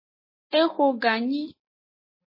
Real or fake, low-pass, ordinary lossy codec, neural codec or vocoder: real; 5.4 kHz; MP3, 24 kbps; none